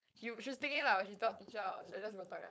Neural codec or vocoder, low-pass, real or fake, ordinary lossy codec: codec, 16 kHz, 4.8 kbps, FACodec; none; fake; none